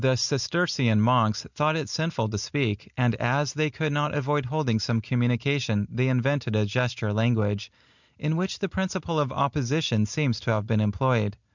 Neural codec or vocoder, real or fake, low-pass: none; real; 7.2 kHz